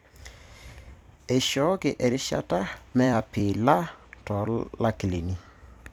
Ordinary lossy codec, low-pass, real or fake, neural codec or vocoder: none; 19.8 kHz; fake; vocoder, 44.1 kHz, 128 mel bands every 256 samples, BigVGAN v2